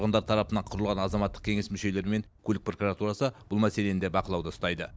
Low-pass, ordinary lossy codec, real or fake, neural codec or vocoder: none; none; real; none